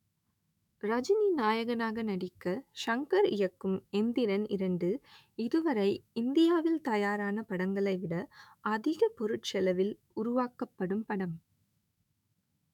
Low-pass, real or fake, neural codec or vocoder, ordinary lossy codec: 19.8 kHz; fake; autoencoder, 48 kHz, 128 numbers a frame, DAC-VAE, trained on Japanese speech; none